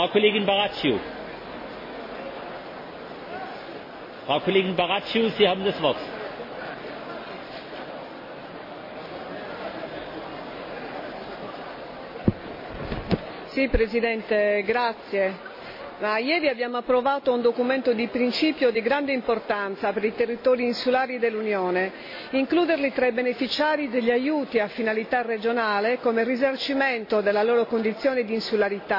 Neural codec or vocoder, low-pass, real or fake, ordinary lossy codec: none; 5.4 kHz; real; MP3, 24 kbps